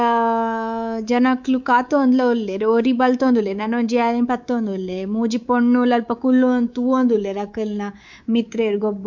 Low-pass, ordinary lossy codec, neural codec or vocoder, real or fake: 7.2 kHz; none; codec, 24 kHz, 3.1 kbps, DualCodec; fake